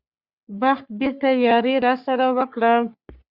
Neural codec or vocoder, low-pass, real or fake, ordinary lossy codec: codec, 44.1 kHz, 3.4 kbps, Pupu-Codec; 5.4 kHz; fake; Opus, 64 kbps